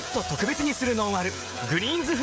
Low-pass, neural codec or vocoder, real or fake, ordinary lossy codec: none; codec, 16 kHz, 16 kbps, FreqCodec, smaller model; fake; none